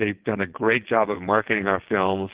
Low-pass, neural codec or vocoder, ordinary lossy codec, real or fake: 3.6 kHz; vocoder, 22.05 kHz, 80 mel bands, WaveNeXt; Opus, 16 kbps; fake